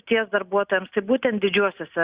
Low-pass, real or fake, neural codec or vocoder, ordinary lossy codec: 3.6 kHz; real; none; Opus, 64 kbps